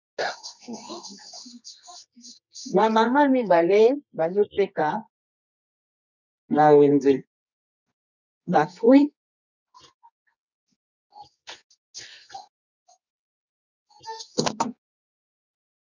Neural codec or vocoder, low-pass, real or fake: codec, 24 kHz, 0.9 kbps, WavTokenizer, medium music audio release; 7.2 kHz; fake